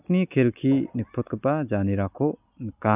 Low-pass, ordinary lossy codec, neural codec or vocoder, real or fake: 3.6 kHz; none; none; real